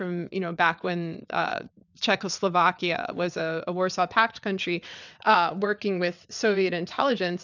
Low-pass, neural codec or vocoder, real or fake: 7.2 kHz; vocoder, 22.05 kHz, 80 mel bands, WaveNeXt; fake